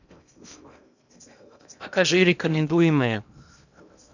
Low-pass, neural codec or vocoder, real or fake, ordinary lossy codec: 7.2 kHz; codec, 16 kHz in and 24 kHz out, 0.8 kbps, FocalCodec, streaming, 65536 codes; fake; none